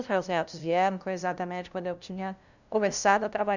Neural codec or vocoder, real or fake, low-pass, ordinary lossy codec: codec, 16 kHz, 0.5 kbps, FunCodec, trained on LibriTTS, 25 frames a second; fake; 7.2 kHz; none